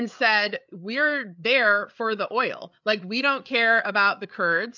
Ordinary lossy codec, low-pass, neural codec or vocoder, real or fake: MP3, 64 kbps; 7.2 kHz; codec, 16 kHz, 8 kbps, FreqCodec, larger model; fake